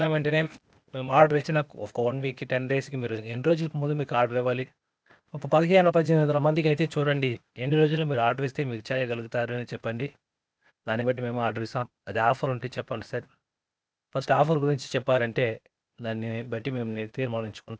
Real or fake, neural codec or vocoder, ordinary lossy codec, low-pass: fake; codec, 16 kHz, 0.8 kbps, ZipCodec; none; none